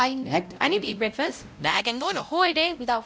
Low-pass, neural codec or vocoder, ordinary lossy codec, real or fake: none; codec, 16 kHz, 0.5 kbps, X-Codec, WavLM features, trained on Multilingual LibriSpeech; none; fake